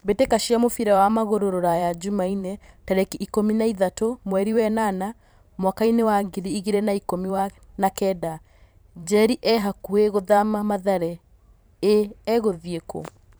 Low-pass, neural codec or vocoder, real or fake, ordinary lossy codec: none; vocoder, 44.1 kHz, 128 mel bands every 512 samples, BigVGAN v2; fake; none